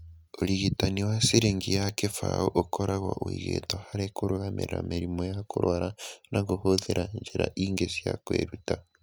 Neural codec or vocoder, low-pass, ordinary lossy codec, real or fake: none; none; none; real